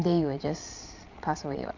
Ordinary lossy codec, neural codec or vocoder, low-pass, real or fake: none; vocoder, 22.05 kHz, 80 mel bands, Vocos; 7.2 kHz; fake